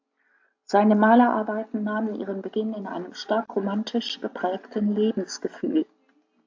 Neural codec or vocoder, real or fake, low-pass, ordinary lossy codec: none; real; 7.2 kHz; AAC, 48 kbps